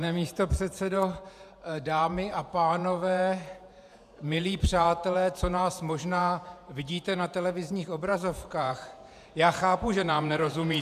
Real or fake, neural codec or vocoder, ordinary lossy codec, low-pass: fake; vocoder, 48 kHz, 128 mel bands, Vocos; AAC, 96 kbps; 14.4 kHz